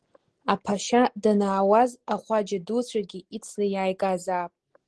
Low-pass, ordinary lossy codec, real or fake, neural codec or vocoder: 10.8 kHz; Opus, 16 kbps; real; none